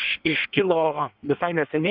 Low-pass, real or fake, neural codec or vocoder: 5.4 kHz; fake; codec, 16 kHz in and 24 kHz out, 1.1 kbps, FireRedTTS-2 codec